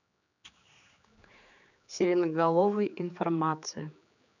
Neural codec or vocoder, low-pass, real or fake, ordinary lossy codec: codec, 16 kHz, 4 kbps, X-Codec, HuBERT features, trained on general audio; 7.2 kHz; fake; none